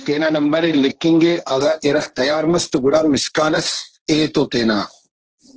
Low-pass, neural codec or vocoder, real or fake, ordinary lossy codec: 7.2 kHz; codec, 16 kHz, 1.1 kbps, Voila-Tokenizer; fake; Opus, 16 kbps